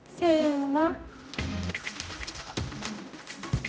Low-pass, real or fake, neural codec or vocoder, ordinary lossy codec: none; fake; codec, 16 kHz, 0.5 kbps, X-Codec, HuBERT features, trained on general audio; none